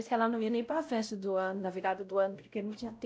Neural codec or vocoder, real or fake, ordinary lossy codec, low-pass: codec, 16 kHz, 0.5 kbps, X-Codec, WavLM features, trained on Multilingual LibriSpeech; fake; none; none